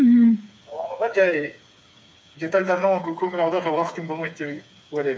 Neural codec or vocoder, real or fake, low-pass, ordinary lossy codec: codec, 16 kHz, 4 kbps, FreqCodec, smaller model; fake; none; none